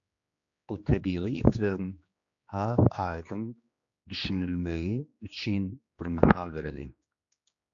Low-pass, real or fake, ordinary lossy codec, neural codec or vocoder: 7.2 kHz; fake; AAC, 64 kbps; codec, 16 kHz, 2 kbps, X-Codec, HuBERT features, trained on general audio